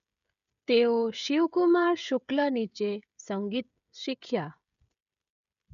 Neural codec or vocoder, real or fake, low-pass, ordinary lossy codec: codec, 16 kHz, 16 kbps, FreqCodec, smaller model; fake; 7.2 kHz; none